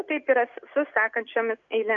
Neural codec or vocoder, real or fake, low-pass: none; real; 7.2 kHz